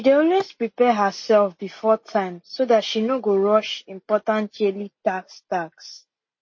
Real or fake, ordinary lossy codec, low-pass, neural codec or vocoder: real; MP3, 32 kbps; 7.2 kHz; none